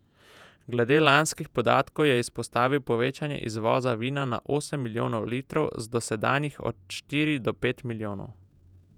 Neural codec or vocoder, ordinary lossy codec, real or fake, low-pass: vocoder, 48 kHz, 128 mel bands, Vocos; none; fake; 19.8 kHz